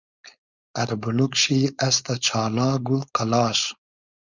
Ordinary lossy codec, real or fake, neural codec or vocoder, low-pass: Opus, 64 kbps; fake; codec, 16 kHz, 4.8 kbps, FACodec; 7.2 kHz